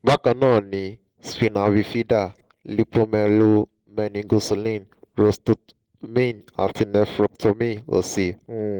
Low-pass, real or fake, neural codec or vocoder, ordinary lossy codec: 19.8 kHz; real; none; Opus, 16 kbps